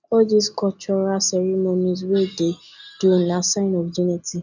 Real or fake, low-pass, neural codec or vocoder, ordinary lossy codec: real; 7.2 kHz; none; none